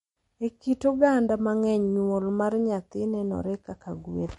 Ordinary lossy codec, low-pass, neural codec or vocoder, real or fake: MP3, 48 kbps; 19.8 kHz; none; real